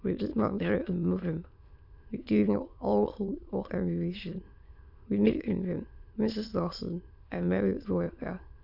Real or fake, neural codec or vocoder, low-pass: fake; autoencoder, 22.05 kHz, a latent of 192 numbers a frame, VITS, trained on many speakers; 5.4 kHz